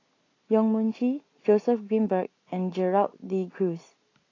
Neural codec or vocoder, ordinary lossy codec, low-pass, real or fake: none; AAC, 32 kbps; 7.2 kHz; real